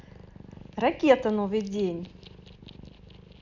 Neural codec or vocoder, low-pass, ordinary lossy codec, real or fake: none; 7.2 kHz; none; real